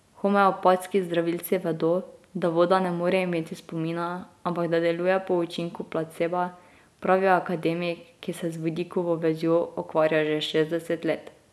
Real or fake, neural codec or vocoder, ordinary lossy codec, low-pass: real; none; none; none